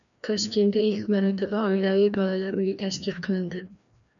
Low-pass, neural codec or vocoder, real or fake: 7.2 kHz; codec, 16 kHz, 1 kbps, FreqCodec, larger model; fake